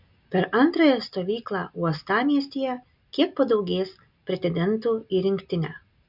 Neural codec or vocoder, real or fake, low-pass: none; real; 5.4 kHz